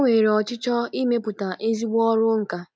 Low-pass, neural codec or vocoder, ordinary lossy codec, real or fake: none; none; none; real